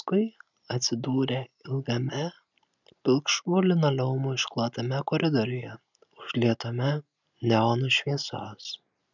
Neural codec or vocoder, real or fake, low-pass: none; real; 7.2 kHz